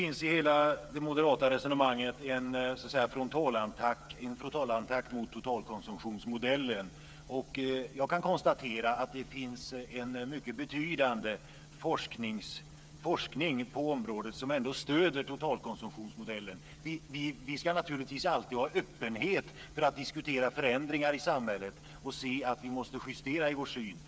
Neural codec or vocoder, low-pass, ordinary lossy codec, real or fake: codec, 16 kHz, 8 kbps, FreqCodec, smaller model; none; none; fake